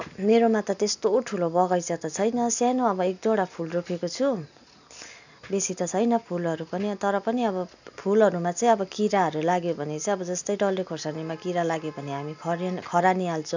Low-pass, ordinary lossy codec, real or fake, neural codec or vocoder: 7.2 kHz; none; real; none